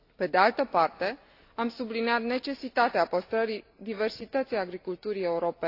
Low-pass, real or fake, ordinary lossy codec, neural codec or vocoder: 5.4 kHz; real; AAC, 32 kbps; none